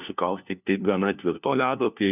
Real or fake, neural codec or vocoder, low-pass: fake; codec, 16 kHz, 1 kbps, FunCodec, trained on LibriTTS, 50 frames a second; 3.6 kHz